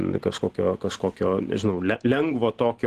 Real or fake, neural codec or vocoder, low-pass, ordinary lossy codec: real; none; 14.4 kHz; Opus, 16 kbps